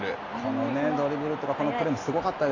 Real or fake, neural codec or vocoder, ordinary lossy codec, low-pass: real; none; AAC, 32 kbps; 7.2 kHz